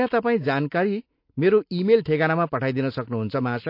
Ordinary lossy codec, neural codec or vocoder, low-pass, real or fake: MP3, 32 kbps; none; 5.4 kHz; real